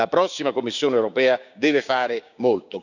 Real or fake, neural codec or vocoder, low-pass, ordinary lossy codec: fake; codec, 16 kHz, 6 kbps, DAC; 7.2 kHz; none